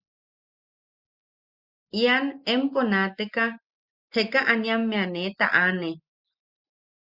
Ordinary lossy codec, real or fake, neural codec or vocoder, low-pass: Opus, 64 kbps; real; none; 5.4 kHz